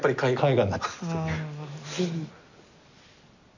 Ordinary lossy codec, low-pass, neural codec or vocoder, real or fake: none; 7.2 kHz; none; real